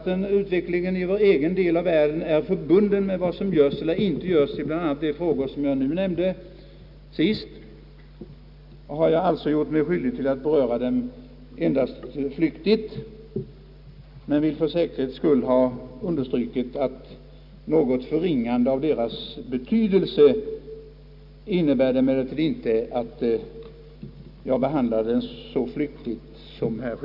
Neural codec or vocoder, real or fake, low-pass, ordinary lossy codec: none; real; 5.4 kHz; none